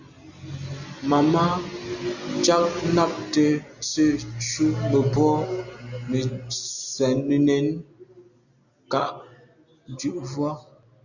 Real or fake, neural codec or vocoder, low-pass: real; none; 7.2 kHz